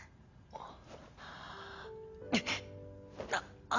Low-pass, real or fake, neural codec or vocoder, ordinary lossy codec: 7.2 kHz; real; none; Opus, 64 kbps